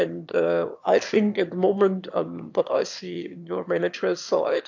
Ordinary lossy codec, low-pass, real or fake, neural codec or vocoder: AAC, 48 kbps; 7.2 kHz; fake; autoencoder, 22.05 kHz, a latent of 192 numbers a frame, VITS, trained on one speaker